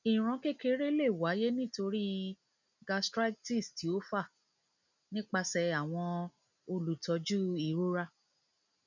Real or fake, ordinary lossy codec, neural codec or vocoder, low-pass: real; none; none; 7.2 kHz